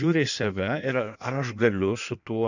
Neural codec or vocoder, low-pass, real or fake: codec, 16 kHz in and 24 kHz out, 1.1 kbps, FireRedTTS-2 codec; 7.2 kHz; fake